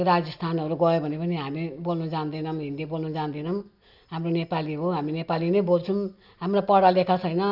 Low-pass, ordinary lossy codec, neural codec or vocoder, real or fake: 5.4 kHz; none; none; real